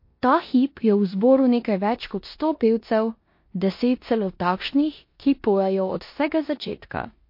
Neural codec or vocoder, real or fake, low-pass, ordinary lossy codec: codec, 16 kHz in and 24 kHz out, 0.9 kbps, LongCat-Audio-Codec, four codebook decoder; fake; 5.4 kHz; MP3, 32 kbps